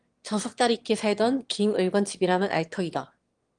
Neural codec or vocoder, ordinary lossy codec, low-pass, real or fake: autoencoder, 22.05 kHz, a latent of 192 numbers a frame, VITS, trained on one speaker; Opus, 24 kbps; 9.9 kHz; fake